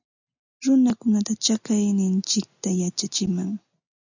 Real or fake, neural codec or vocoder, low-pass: real; none; 7.2 kHz